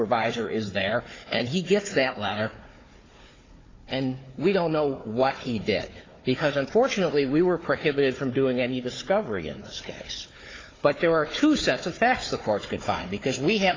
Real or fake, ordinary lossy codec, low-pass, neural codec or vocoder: fake; AAC, 32 kbps; 7.2 kHz; codec, 16 kHz, 4 kbps, FunCodec, trained on Chinese and English, 50 frames a second